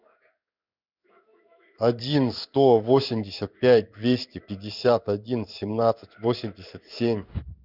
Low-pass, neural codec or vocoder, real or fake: 5.4 kHz; codec, 16 kHz in and 24 kHz out, 1 kbps, XY-Tokenizer; fake